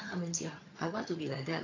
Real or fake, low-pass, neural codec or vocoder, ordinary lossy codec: fake; 7.2 kHz; vocoder, 22.05 kHz, 80 mel bands, HiFi-GAN; AAC, 32 kbps